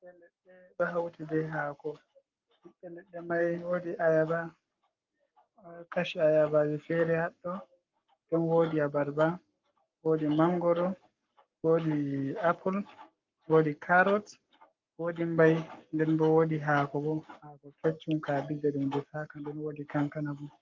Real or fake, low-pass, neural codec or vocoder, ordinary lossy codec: fake; 7.2 kHz; codec, 44.1 kHz, 7.8 kbps, Pupu-Codec; Opus, 32 kbps